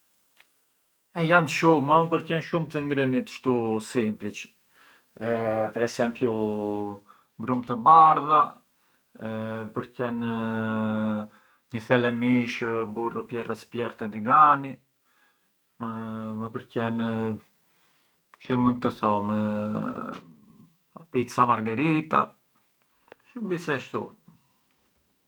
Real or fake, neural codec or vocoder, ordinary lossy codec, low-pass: fake; codec, 44.1 kHz, 2.6 kbps, SNAC; none; none